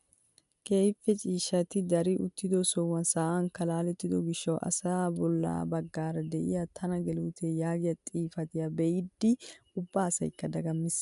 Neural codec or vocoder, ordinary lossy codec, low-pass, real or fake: none; MP3, 96 kbps; 10.8 kHz; real